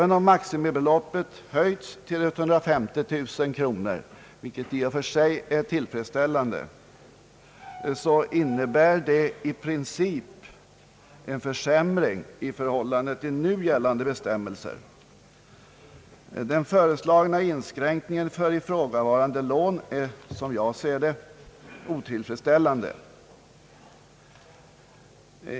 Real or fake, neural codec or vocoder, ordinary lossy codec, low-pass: real; none; none; none